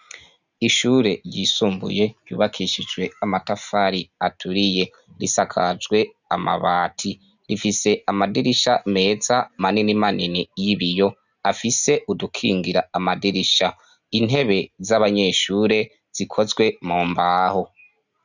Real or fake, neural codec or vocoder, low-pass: real; none; 7.2 kHz